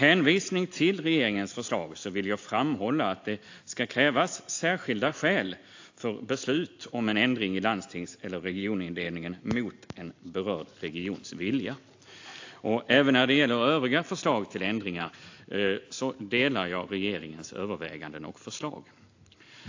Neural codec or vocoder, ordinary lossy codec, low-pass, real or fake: none; AAC, 48 kbps; 7.2 kHz; real